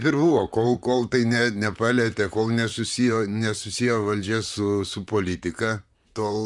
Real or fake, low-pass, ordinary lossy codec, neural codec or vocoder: fake; 10.8 kHz; MP3, 96 kbps; vocoder, 44.1 kHz, 128 mel bands, Pupu-Vocoder